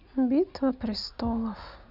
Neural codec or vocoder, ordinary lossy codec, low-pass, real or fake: none; none; 5.4 kHz; real